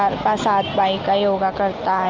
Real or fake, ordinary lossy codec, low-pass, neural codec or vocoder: real; Opus, 24 kbps; 7.2 kHz; none